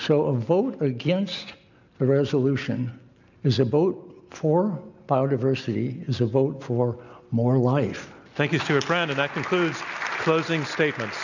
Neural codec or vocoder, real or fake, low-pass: none; real; 7.2 kHz